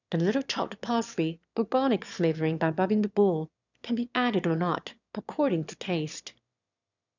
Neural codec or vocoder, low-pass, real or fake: autoencoder, 22.05 kHz, a latent of 192 numbers a frame, VITS, trained on one speaker; 7.2 kHz; fake